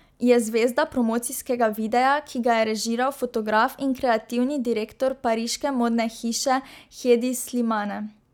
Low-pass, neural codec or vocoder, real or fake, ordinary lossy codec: 19.8 kHz; none; real; none